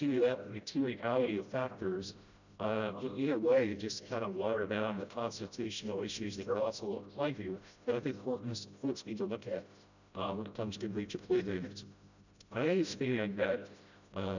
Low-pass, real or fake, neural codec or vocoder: 7.2 kHz; fake; codec, 16 kHz, 0.5 kbps, FreqCodec, smaller model